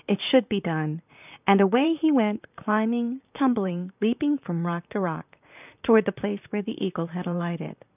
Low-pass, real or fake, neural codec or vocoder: 3.6 kHz; fake; vocoder, 44.1 kHz, 128 mel bands, Pupu-Vocoder